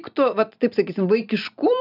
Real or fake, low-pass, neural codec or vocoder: real; 5.4 kHz; none